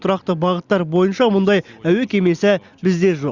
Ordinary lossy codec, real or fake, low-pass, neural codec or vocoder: Opus, 64 kbps; real; 7.2 kHz; none